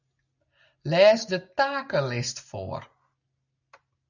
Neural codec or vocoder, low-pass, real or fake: none; 7.2 kHz; real